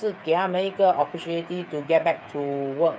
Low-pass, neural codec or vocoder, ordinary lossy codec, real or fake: none; codec, 16 kHz, 8 kbps, FreqCodec, smaller model; none; fake